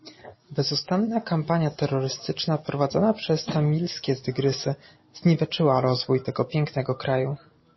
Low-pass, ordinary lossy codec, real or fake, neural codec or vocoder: 7.2 kHz; MP3, 24 kbps; real; none